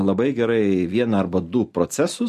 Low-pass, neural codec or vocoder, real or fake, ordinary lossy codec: 14.4 kHz; none; real; MP3, 64 kbps